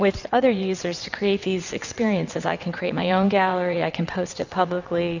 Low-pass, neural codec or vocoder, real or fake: 7.2 kHz; vocoder, 22.05 kHz, 80 mel bands, WaveNeXt; fake